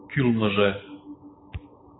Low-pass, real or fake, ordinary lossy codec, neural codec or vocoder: 7.2 kHz; real; AAC, 16 kbps; none